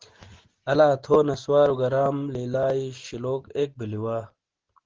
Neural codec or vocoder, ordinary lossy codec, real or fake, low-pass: none; Opus, 16 kbps; real; 7.2 kHz